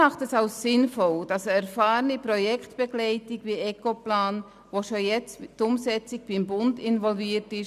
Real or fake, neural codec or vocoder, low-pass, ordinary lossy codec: real; none; 14.4 kHz; none